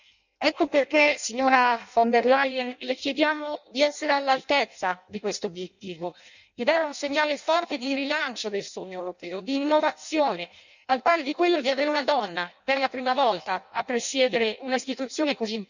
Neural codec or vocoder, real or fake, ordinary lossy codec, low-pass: codec, 16 kHz in and 24 kHz out, 0.6 kbps, FireRedTTS-2 codec; fake; none; 7.2 kHz